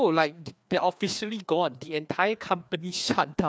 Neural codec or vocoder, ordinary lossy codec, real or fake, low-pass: codec, 16 kHz, 2 kbps, FreqCodec, larger model; none; fake; none